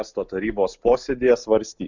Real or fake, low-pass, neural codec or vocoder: real; 7.2 kHz; none